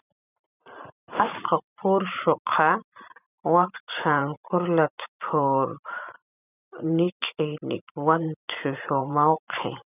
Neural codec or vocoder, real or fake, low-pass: vocoder, 44.1 kHz, 128 mel bands every 512 samples, BigVGAN v2; fake; 3.6 kHz